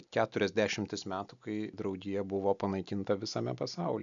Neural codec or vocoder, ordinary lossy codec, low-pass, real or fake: none; MP3, 96 kbps; 7.2 kHz; real